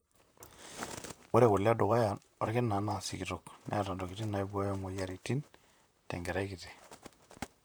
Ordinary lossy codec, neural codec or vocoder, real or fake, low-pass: none; vocoder, 44.1 kHz, 128 mel bands, Pupu-Vocoder; fake; none